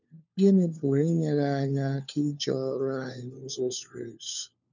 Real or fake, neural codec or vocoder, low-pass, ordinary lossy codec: fake; codec, 16 kHz, 4 kbps, FunCodec, trained on LibriTTS, 50 frames a second; 7.2 kHz; none